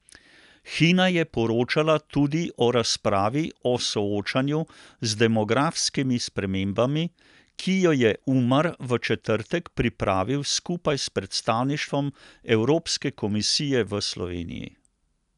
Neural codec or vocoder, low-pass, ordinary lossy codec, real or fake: none; 10.8 kHz; none; real